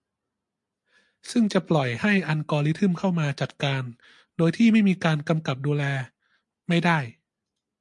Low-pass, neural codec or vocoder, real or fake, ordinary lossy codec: 10.8 kHz; none; real; MP3, 64 kbps